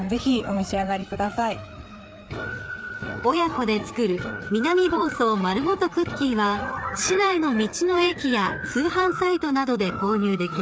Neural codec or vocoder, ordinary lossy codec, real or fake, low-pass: codec, 16 kHz, 4 kbps, FreqCodec, larger model; none; fake; none